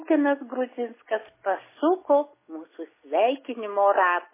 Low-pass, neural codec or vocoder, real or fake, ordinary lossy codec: 3.6 kHz; none; real; MP3, 16 kbps